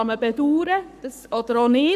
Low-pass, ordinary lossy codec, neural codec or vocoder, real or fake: 14.4 kHz; none; codec, 44.1 kHz, 7.8 kbps, Pupu-Codec; fake